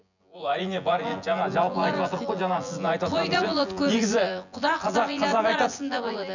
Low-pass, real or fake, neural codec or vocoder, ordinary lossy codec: 7.2 kHz; fake; vocoder, 24 kHz, 100 mel bands, Vocos; none